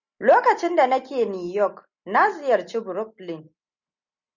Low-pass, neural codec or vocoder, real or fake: 7.2 kHz; none; real